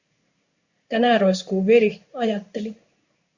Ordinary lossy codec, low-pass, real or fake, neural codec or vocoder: Opus, 64 kbps; 7.2 kHz; fake; codec, 16 kHz in and 24 kHz out, 1 kbps, XY-Tokenizer